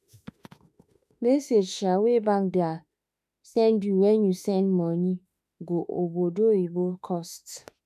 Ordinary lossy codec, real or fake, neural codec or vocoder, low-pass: none; fake; autoencoder, 48 kHz, 32 numbers a frame, DAC-VAE, trained on Japanese speech; 14.4 kHz